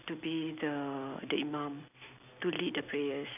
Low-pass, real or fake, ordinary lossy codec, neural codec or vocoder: 3.6 kHz; real; none; none